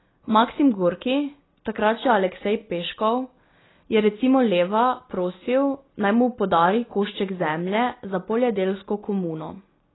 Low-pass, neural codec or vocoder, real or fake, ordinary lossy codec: 7.2 kHz; none; real; AAC, 16 kbps